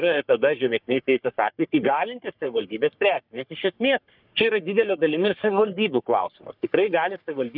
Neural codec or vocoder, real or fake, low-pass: codec, 44.1 kHz, 3.4 kbps, Pupu-Codec; fake; 5.4 kHz